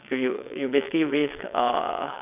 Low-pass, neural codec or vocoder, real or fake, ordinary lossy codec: 3.6 kHz; vocoder, 22.05 kHz, 80 mel bands, WaveNeXt; fake; none